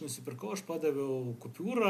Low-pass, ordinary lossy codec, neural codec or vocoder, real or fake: 14.4 kHz; MP3, 96 kbps; none; real